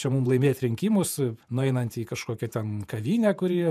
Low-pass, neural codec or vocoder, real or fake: 14.4 kHz; vocoder, 48 kHz, 128 mel bands, Vocos; fake